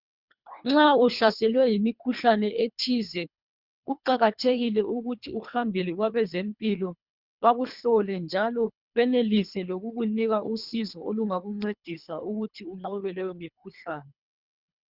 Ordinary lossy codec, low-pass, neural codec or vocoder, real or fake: Opus, 64 kbps; 5.4 kHz; codec, 24 kHz, 3 kbps, HILCodec; fake